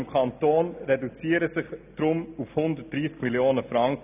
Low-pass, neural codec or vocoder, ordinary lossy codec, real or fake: 3.6 kHz; none; none; real